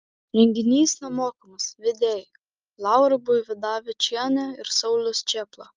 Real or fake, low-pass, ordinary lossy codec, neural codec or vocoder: real; 7.2 kHz; Opus, 24 kbps; none